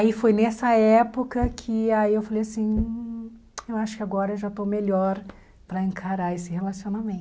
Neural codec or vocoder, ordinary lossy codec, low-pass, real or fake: none; none; none; real